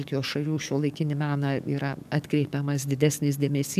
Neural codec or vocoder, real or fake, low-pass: codec, 44.1 kHz, 7.8 kbps, DAC; fake; 14.4 kHz